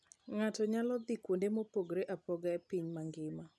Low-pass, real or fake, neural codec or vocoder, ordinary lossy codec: none; real; none; none